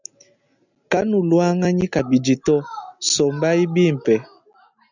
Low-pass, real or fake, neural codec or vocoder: 7.2 kHz; real; none